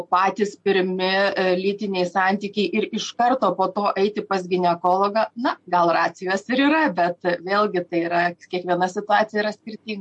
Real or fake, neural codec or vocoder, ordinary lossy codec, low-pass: real; none; MP3, 48 kbps; 10.8 kHz